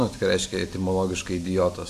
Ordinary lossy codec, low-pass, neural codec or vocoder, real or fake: AAC, 64 kbps; 14.4 kHz; none; real